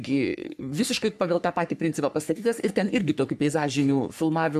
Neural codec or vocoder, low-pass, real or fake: codec, 44.1 kHz, 3.4 kbps, Pupu-Codec; 14.4 kHz; fake